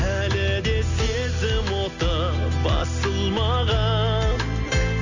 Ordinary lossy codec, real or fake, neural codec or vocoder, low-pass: none; real; none; 7.2 kHz